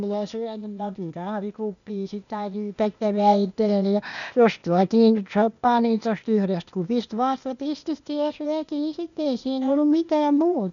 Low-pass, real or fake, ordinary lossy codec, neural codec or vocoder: 7.2 kHz; fake; none; codec, 16 kHz, 0.8 kbps, ZipCodec